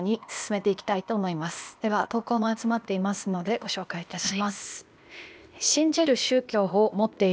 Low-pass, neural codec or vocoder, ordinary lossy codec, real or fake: none; codec, 16 kHz, 0.8 kbps, ZipCodec; none; fake